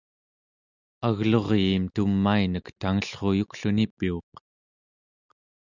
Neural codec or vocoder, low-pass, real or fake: none; 7.2 kHz; real